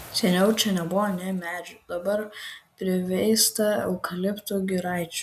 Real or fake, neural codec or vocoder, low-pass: real; none; 14.4 kHz